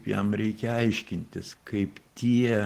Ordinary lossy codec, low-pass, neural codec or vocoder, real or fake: Opus, 16 kbps; 14.4 kHz; none; real